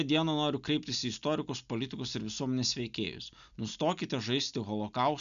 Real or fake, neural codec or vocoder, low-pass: real; none; 7.2 kHz